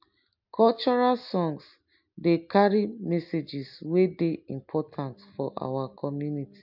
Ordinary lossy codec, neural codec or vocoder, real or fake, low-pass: MP3, 48 kbps; none; real; 5.4 kHz